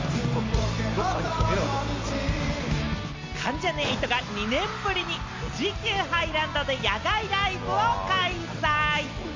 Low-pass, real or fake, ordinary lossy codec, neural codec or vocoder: 7.2 kHz; real; MP3, 48 kbps; none